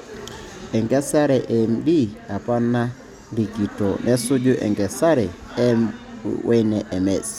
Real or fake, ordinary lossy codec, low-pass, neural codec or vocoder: real; none; 19.8 kHz; none